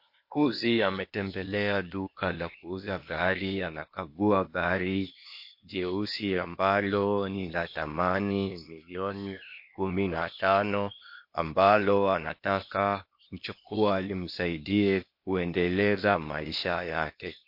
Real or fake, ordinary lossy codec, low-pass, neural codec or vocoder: fake; MP3, 32 kbps; 5.4 kHz; codec, 16 kHz, 0.8 kbps, ZipCodec